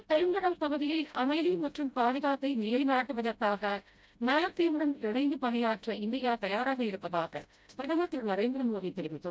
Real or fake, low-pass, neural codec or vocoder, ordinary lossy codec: fake; none; codec, 16 kHz, 0.5 kbps, FreqCodec, smaller model; none